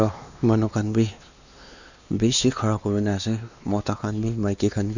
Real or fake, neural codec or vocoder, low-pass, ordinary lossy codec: fake; codec, 16 kHz, 2 kbps, X-Codec, WavLM features, trained on Multilingual LibriSpeech; 7.2 kHz; none